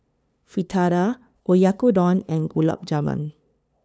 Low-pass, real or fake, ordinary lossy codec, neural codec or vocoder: none; fake; none; codec, 16 kHz, 2 kbps, FunCodec, trained on LibriTTS, 25 frames a second